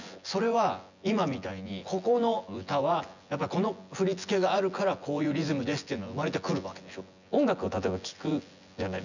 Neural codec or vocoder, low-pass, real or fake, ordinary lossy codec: vocoder, 24 kHz, 100 mel bands, Vocos; 7.2 kHz; fake; none